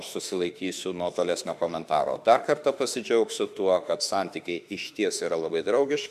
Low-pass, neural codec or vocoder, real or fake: 14.4 kHz; autoencoder, 48 kHz, 32 numbers a frame, DAC-VAE, trained on Japanese speech; fake